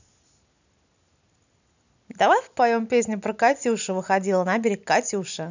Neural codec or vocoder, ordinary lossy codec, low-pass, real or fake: none; none; 7.2 kHz; real